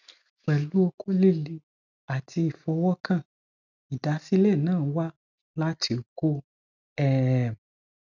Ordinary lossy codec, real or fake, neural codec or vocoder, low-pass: none; real; none; 7.2 kHz